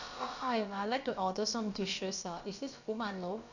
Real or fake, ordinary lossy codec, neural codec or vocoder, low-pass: fake; none; codec, 16 kHz, about 1 kbps, DyCAST, with the encoder's durations; 7.2 kHz